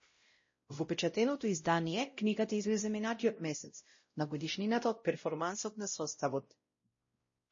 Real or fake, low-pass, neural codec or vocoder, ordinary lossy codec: fake; 7.2 kHz; codec, 16 kHz, 0.5 kbps, X-Codec, WavLM features, trained on Multilingual LibriSpeech; MP3, 32 kbps